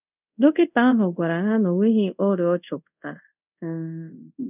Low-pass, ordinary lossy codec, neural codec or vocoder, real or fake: 3.6 kHz; none; codec, 24 kHz, 0.5 kbps, DualCodec; fake